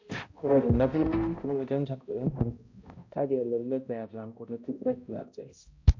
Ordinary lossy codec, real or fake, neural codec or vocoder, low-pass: MP3, 48 kbps; fake; codec, 16 kHz, 0.5 kbps, X-Codec, HuBERT features, trained on balanced general audio; 7.2 kHz